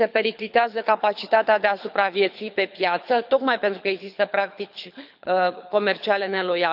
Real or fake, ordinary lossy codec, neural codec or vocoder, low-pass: fake; none; codec, 24 kHz, 6 kbps, HILCodec; 5.4 kHz